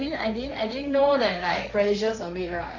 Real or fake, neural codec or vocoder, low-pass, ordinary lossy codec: fake; codec, 16 kHz, 1.1 kbps, Voila-Tokenizer; 7.2 kHz; none